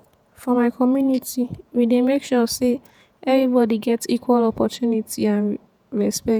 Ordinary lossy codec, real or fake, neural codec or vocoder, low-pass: none; fake; vocoder, 48 kHz, 128 mel bands, Vocos; none